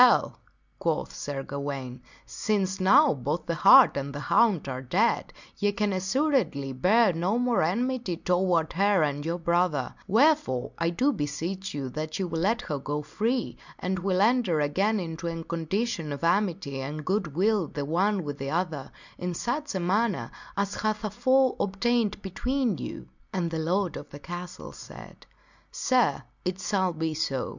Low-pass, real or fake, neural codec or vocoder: 7.2 kHz; real; none